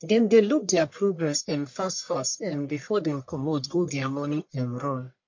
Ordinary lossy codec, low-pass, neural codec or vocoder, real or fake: MP3, 48 kbps; 7.2 kHz; codec, 44.1 kHz, 1.7 kbps, Pupu-Codec; fake